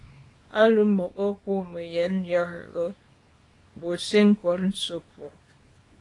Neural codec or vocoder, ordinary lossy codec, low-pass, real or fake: codec, 24 kHz, 0.9 kbps, WavTokenizer, small release; AAC, 32 kbps; 10.8 kHz; fake